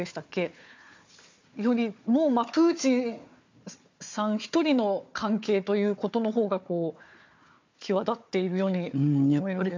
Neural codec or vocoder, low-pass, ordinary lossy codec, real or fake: codec, 16 kHz, 4 kbps, FunCodec, trained on Chinese and English, 50 frames a second; 7.2 kHz; MP3, 64 kbps; fake